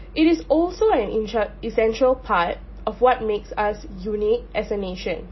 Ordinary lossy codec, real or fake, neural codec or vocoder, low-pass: MP3, 24 kbps; real; none; 7.2 kHz